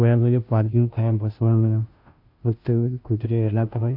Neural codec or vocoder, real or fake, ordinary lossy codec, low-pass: codec, 16 kHz, 0.5 kbps, FunCodec, trained on Chinese and English, 25 frames a second; fake; AAC, 48 kbps; 5.4 kHz